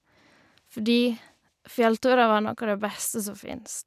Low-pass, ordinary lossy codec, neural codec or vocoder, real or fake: 14.4 kHz; none; none; real